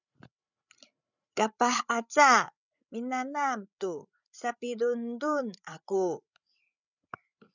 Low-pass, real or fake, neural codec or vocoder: 7.2 kHz; fake; codec, 16 kHz, 8 kbps, FreqCodec, larger model